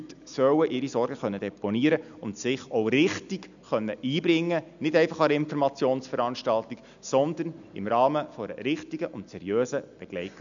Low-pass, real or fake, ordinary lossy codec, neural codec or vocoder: 7.2 kHz; real; none; none